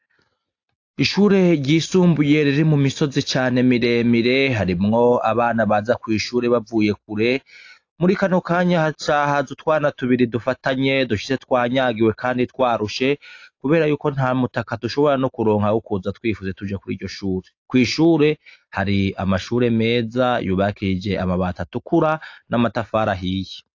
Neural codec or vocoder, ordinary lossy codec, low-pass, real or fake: none; AAC, 48 kbps; 7.2 kHz; real